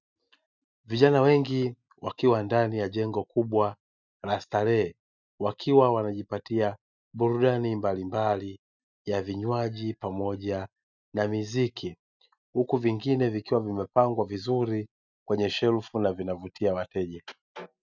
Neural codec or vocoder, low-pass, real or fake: none; 7.2 kHz; real